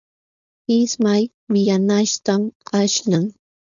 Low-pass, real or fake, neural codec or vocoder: 7.2 kHz; fake; codec, 16 kHz, 4.8 kbps, FACodec